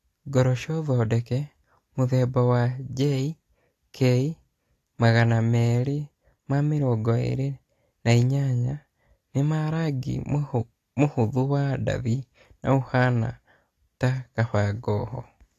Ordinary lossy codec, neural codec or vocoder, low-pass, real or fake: AAC, 48 kbps; none; 14.4 kHz; real